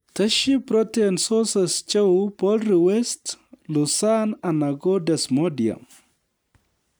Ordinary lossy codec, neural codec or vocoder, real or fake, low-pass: none; none; real; none